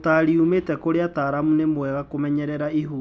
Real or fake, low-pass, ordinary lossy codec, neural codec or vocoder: real; none; none; none